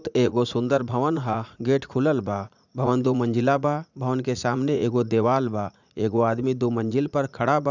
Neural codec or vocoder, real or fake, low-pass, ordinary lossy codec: vocoder, 44.1 kHz, 80 mel bands, Vocos; fake; 7.2 kHz; none